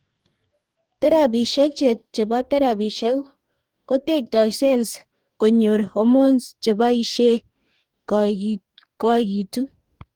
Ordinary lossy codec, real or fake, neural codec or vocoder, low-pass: Opus, 24 kbps; fake; codec, 44.1 kHz, 2.6 kbps, DAC; 19.8 kHz